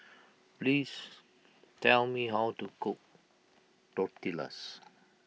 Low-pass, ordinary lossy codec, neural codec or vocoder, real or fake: none; none; none; real